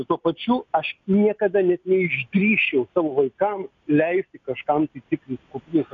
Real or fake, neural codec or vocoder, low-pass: real; none; 7.2 kHz